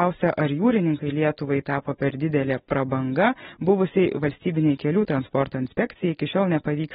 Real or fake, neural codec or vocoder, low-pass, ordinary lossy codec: real; none; 14.4 kHz; AAC, 16 kbps